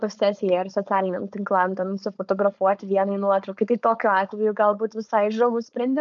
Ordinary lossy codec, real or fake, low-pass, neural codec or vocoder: MP3, 96 kbps; fake; 7.2 kHz; codec, 16 kHz, 4.8 kbps, FACodec